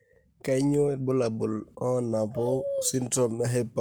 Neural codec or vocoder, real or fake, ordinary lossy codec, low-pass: codec, 44.1 kHz, 7.8 kbps, Pupu-Codec; fake; none; none